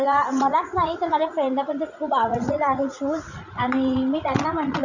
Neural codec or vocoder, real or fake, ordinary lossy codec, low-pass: vocoder, 44.1 kHz, 80 mel bands, Vocos; fake; none; 7.2 kHz